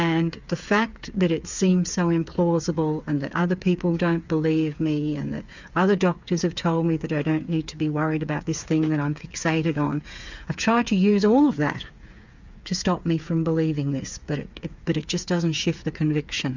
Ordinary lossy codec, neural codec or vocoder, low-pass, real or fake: Opus, 64 kbps; codec, 16 kHz, 8 kbps, FreqCodec, smaller model; 7.2 kHz; fake